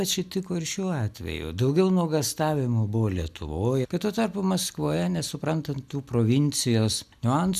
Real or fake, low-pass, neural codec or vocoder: real; 14.4 kHz; none